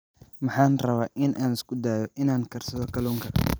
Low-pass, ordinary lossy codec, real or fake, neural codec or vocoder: none; none; fake; vocoder, 44.1 kHz, 128 mel bands every 256 samples, BigVGAN v2